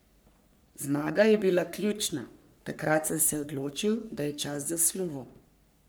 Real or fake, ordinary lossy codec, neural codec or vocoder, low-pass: fake; none; codec, 44.1 kHz, 3.4 kbps, Pupu-Codec; none